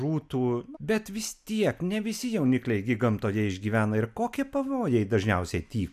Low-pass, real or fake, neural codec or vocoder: 14.4 kHz; real; none